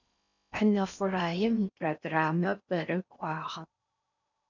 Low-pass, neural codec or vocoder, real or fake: 7.2 kHz; codec, 16 kHz in and 24 kHz out, 0.6 kbps, FocalCodec, streaming, 4096 codes; fake